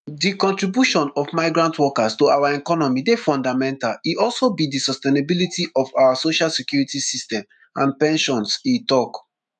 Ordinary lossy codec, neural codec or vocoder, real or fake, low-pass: none; autoencoder, 48 kHz, 128 numbers a frame, DAC-VAE, trained on Japanese speech; fake; 10.8 kHz